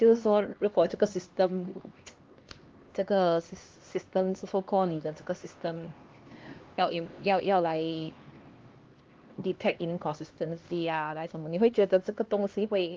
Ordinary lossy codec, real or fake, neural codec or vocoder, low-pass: Opus, 32 kbps; fake; codec, 16 kHz, 2 kbps, X-Codec, HuBERT features, trained on LibriSpeech; 7.2 kHz